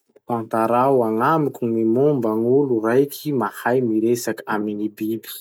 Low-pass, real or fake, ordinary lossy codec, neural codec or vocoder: none; real; none; none